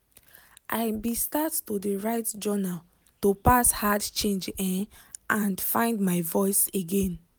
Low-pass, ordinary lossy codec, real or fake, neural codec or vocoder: none; none; real; none